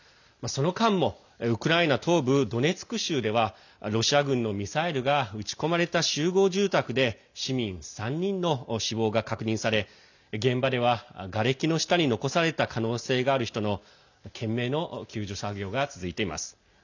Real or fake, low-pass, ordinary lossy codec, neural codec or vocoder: real; 7.2 kHz; none; none